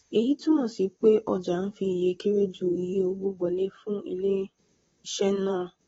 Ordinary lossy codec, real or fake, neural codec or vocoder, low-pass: AAC, 24 kbps; fake; vocoder, 44.1 kHz, 128 mel bands, Pupu-Vocoder; 19.8 kHz